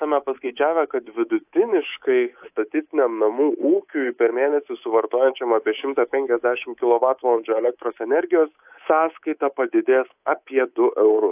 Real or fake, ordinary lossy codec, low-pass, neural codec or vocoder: fake; AAC, 32 kbps; 3.6 kHz; codec, 24 kHz, 3.1 kbps, DualCodec